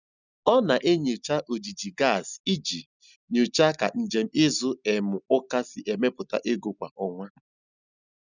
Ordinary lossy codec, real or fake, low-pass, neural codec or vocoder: none; real; 7.2 kHz; none